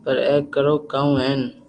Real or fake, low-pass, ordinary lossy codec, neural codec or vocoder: real; 9.9 kHz; Opus, 32 kbps; none